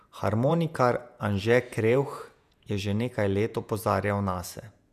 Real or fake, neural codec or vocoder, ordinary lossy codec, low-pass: fake; vocoder, 48 kHz, 128 mel bands, Vocos; none; 14.4 kHz